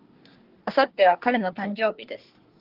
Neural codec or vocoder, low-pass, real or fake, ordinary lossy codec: codec, 24 kHz, 1 kbps, SNAC; 5.4 kHz; fake; Opus, 16 kbps